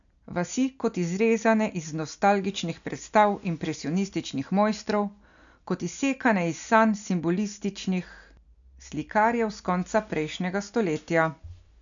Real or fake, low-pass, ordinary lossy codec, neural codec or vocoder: real; 7.2 kHz; none; none